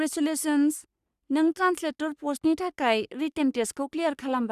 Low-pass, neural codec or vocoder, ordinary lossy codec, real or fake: 14.4 kHz; codec, 44.1 kHz, 3.4 kbps, Pupu-Codec; Opus, 64 kbps; fake